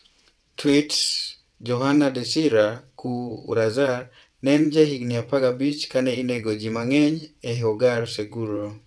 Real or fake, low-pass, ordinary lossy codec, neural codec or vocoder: fake; none; none; vocoder, 22.05 kHz, 80 mel bands, WaveNeXt